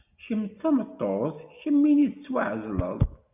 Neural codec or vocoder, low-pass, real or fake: none; 3.6 kHz; real